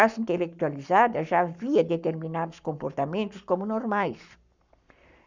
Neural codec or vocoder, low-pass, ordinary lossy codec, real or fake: codec, 44.1 kHz, 7.8 kbps, Pupu-Codec; 7.2 kHz; none; fake